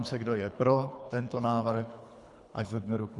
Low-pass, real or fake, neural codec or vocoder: 10.8 kHz; fake; codec, 24 kHz, 3 kbps, HILCodec